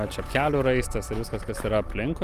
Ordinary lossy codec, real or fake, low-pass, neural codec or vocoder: Opus, 32 kbps; real; 14.4 kHz; none